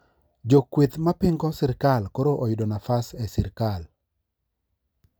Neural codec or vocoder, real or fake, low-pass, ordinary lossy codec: none; real; none; none